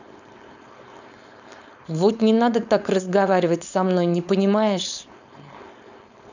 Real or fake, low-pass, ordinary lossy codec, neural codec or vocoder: fake; 7.2 kHz; none; codec, 16 kHz, 4.8 kbps, FACodec